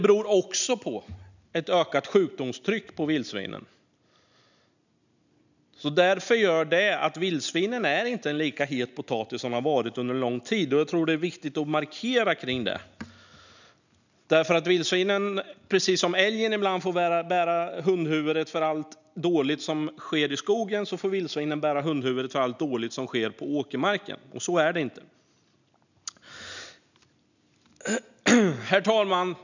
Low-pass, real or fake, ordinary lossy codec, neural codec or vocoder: 7.2 kHz; real; none; none